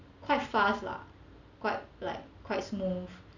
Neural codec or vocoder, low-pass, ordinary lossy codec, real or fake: none; 7.2 kHz; none; real